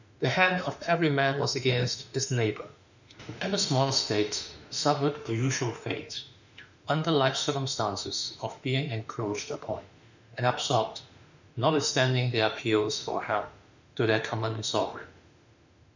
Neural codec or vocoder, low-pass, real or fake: autoencoder, 48 kHz, 32 numbers a frame, DAC-VAE, trained on Japanese speech; 7.2 kHz; fake